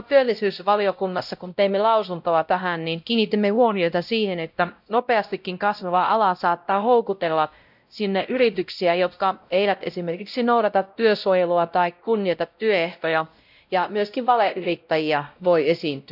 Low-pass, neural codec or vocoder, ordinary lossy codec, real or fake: 5.4 kHz; codec, 16 kHz, 0.5 kbps, X-Codec, WavLM features, trained on Multilingual LibriSpeech; none; fake